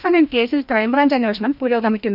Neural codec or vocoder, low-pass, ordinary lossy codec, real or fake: codec, 16 kHz, 1 kbps, FreqCodec, larger model; 5.4 kHz; none; fake